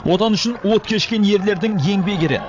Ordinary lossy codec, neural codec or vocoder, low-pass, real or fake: AAC, 48 kbps; codec, 16 kHz, 16 kbps, FreqCodec, larger model; 7.2 kHz; fake